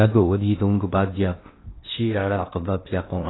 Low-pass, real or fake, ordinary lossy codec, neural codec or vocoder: 7.2 kHz; fake; AAC, 16 kbps; codec, 16 kHz in and 24 kHz out, 0.8 kbps, FocalCodec, streaming, 65536 codes